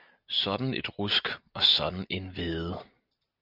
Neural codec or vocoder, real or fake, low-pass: none; real; 5.4 kHz